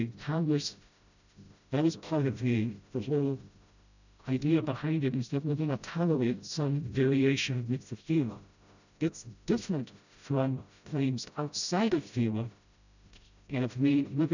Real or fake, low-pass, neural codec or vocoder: fake; 7.2 kHz; codec, 16 kHz, 0.5 kbps, FreqCodec, smaller model